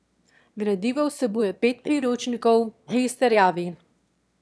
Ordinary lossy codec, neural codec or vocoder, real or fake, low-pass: none; autoencoder, 22.05 kHz, a latent of 192 numbers a frame, VITS, trained on one speaker; fake; none